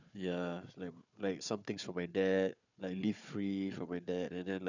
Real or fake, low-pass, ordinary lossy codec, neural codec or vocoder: fake; 7.2 kHz; none; codec, 16 kHz, 4 kbps, FunCodec, trained on Chinese and English, 50 frames a second